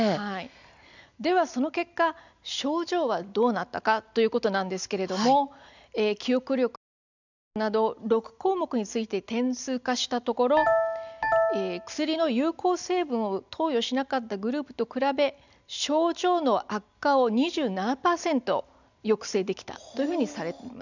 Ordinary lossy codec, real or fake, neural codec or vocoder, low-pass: none; real; none; 7.2 kHz